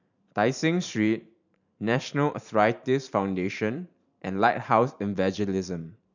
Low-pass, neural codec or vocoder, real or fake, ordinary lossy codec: 7.2 kHz; vocoder, 44.1 kHz, 128 mel bands every 512 samples, BigVGAN v2; fake; none